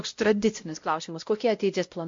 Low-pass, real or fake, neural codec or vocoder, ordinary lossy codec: 7.2 kHz; fake; codec, 16 kHz, 0.5 kbps, X-Codec, WavLM features, trained on Multilingual LibriSpeech; MP3, 48 kbps